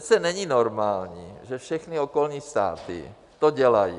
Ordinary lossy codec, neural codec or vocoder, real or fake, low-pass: AAC, 96 kbps; none; real; 10.8 kHz